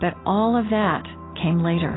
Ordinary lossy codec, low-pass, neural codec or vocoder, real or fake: AAC, 16 kbps; 7.2 kHz; none; real